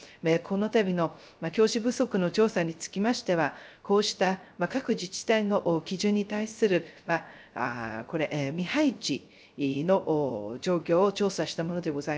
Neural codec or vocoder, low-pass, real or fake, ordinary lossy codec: codec, 16 kHz, 0.3 kbps, FocalCodec; none; fake; none